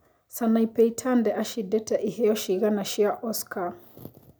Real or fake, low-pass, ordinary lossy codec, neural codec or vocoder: real; none; none; none